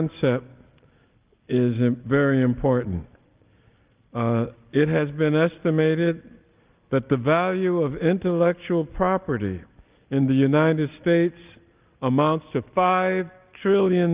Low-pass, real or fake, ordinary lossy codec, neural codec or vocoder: 3.6 kHz; real; Opus, 32 kbps; none